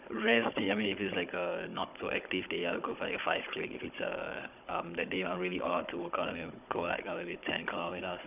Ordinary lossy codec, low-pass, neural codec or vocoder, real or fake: none; 3.6 kHz; codec, 16 kHz, 16 kbps, FunCodec, trained on Chinese and English, 50 frames a second; fake